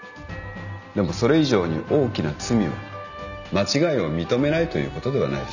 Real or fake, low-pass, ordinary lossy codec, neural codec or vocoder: real; 7.2 kHz; none; none